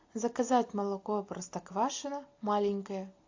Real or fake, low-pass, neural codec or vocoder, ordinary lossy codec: real; 7.2 kHz; none; AAC, 48 kbps